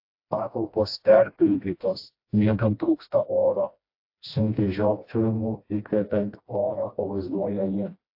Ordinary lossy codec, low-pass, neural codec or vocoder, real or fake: AAC, 32 kbps; 5.4 kHz; codec, 16 kHz, 1 kbps, FreqCodec, smaller model; fake